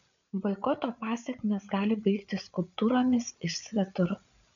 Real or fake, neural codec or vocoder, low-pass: fake; codec, 16 kHz, 8 kbps, FreqCodec, larger model; 7.2 kHz